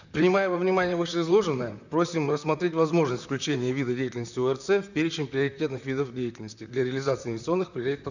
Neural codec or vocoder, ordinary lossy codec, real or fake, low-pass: vocoder, 44.1 kHz, 128 mel bands, Pupu-Vocoder; none; fake; 7.2 kHz